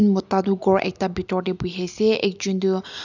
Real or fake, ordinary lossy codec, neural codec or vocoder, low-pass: real; none; none; 7.2 kHz